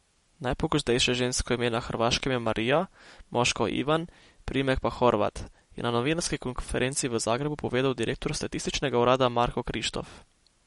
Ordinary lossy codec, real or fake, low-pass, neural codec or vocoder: MP3, 48 kbps; real; 19.8 kHz; none